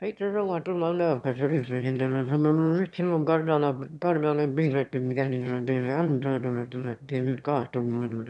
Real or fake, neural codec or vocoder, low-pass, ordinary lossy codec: fake; autoencoder, 22.05 kHz, a latent of 192 numbers a frame, VITS, trained on one speaker; none; none